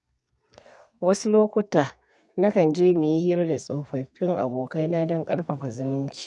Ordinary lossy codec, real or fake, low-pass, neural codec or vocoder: none; fake; 10.8 kHz; codec, 32 kHz, 1.9 kbps, SNAC